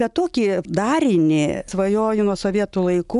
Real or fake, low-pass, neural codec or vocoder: real; 10.8 kHz; none